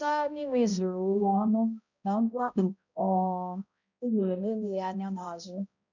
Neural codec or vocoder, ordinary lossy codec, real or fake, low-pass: codec, 16 kHz, 0.5 kbps, X-Codec, HuBERT features, trained on balanced general audio; none; fake; 7.2 kHz